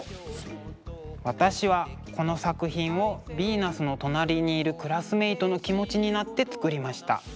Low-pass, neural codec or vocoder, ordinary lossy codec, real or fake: none; none; none; real